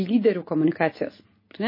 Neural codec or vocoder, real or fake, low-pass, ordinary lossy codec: none; real; 5.4 kHz; MP3, 24 kbps